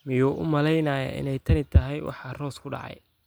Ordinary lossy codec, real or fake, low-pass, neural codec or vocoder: none; real; none; none